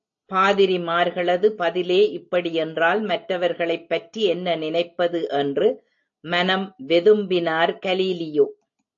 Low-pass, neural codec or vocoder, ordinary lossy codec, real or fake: 7.2 kHz; none; AAC, 48 kbps; real